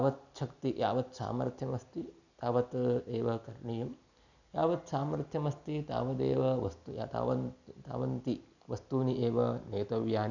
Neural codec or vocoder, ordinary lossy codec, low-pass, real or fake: none; none; 7.2 kHz; real